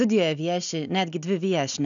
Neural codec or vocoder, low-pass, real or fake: none; 7.2 kHz; real